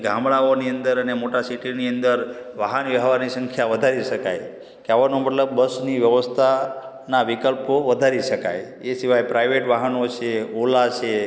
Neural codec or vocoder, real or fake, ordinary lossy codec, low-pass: none; real; none; none